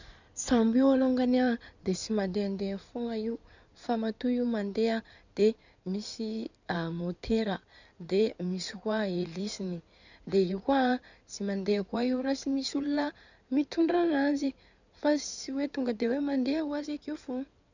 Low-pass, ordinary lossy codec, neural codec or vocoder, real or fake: 7.2 kHz; none; codec, 16 kHz in and 24 kHz out, 2.2 kbps, FireRedTTS-2 codec; fake